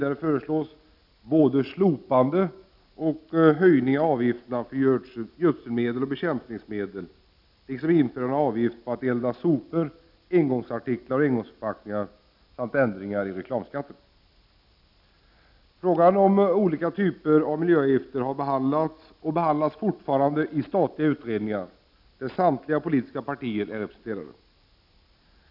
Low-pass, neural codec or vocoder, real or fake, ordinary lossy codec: 5.4 kHz; none; real; none